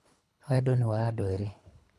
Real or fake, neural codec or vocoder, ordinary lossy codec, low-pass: fake; codec, 24 kHz, 3 kbps, HILCodec; none; none